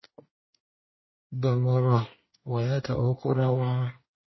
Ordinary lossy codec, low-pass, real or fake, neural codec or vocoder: MP3, 24 kbps; 7.2 kHz; fake; codec, 24 kHz, 1 kbps, SNAC